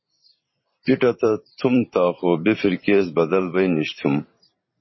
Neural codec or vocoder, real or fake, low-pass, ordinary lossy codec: vocoder, 44.1 kHz, 128 mel bands every 512 samples, BigVGAN v2; fake; 7.2 kHz; MP3, 24 kbps